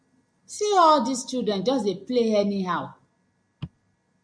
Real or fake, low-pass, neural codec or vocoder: real; 9.9 kHz; none